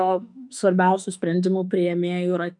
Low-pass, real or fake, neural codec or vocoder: 10.8 kHz; fake; autoencoder, 48 kHz, 32 numbers a frame, DAC-VAE, trained on Japanese speech